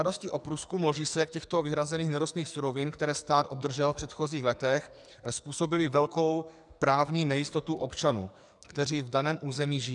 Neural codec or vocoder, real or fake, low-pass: codec, 44.1 kHz, 2.6 kbps, SNAC; fake; 10.8 kHz